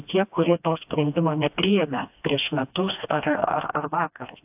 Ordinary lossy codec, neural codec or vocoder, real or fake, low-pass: AAC, 32 kbps; codec, 16 kHz, 1 kbps, FreqCodec, smaller model; fake; 3.6 kHz